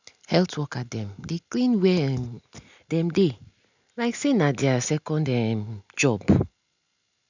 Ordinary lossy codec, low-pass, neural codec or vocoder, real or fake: none; 7.2 kHz; none; real